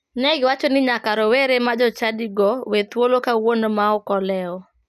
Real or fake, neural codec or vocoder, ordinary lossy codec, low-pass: real; none; none; 14.4 kHz